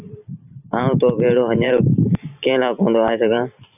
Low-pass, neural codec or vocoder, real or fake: 3.6 kHz; vocoder, 44.1 kHz, 128 mel bands every 512 samples, BigVGAN v2; fake